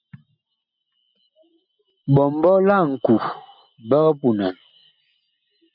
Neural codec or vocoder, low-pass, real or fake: none; 5.4 kHz; real